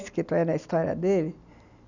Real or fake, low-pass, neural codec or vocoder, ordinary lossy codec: real; 7.2 kHz; none; none